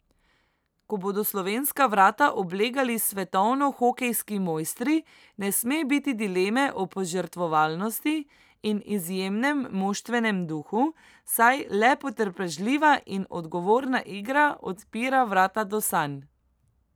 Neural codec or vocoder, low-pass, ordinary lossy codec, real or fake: none; none; none; real